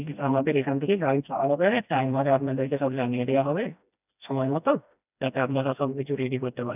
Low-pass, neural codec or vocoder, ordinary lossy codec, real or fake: 3.6 kHz; codec, 16 kHz, 1 kbps, FreqCodec, smaller model; none; fake